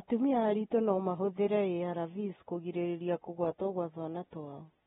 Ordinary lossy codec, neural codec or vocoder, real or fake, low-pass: AAC, 16 kbps; none; real; 19.8 kHz